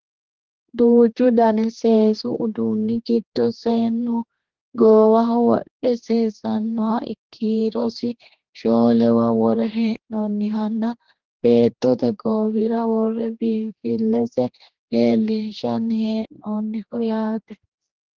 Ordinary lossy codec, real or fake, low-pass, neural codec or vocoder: Opus, 16 kbps; fake; 7.2 kHz; codec, 16 kHz, 2 kbps, X-Codec, HuBERT features, trained on general audio